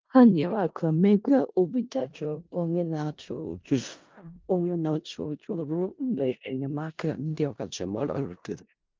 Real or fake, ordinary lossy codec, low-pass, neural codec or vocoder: fake; Opus, 32 kbps; 7.2 kHz; codec, 16 kHz in and 24 kHz out, 0.4 kbps, LongCat-Audio-Codec, four codebook decoder